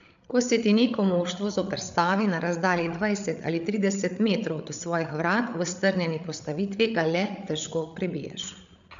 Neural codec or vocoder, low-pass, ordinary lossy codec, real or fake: codec, 16 kHz, 8 kbps, FreqCodec, larger model; 7.2 kHz; AAC, 96 kbps; fake